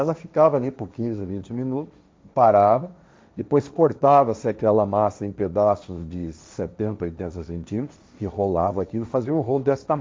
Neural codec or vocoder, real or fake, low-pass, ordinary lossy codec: codec, 16 kHz, 1.1 kbps, Voila-Tokenizer; fake; none; none